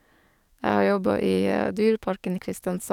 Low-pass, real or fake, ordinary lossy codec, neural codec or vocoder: 19.8 kHz; fake; none; codec, 44.1 kHz, 7.8 kbps, DAC